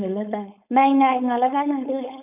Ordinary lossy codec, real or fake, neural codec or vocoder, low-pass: none; fake; codec, 16 kHz, 4.8 kbps, FACodec; 3.6 kHz